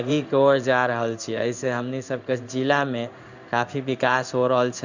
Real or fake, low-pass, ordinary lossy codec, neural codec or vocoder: fake; 7.2 kHz; none; codec, 16 kHz in and 24 kHz out, 1 kbps, XY-Tokenizer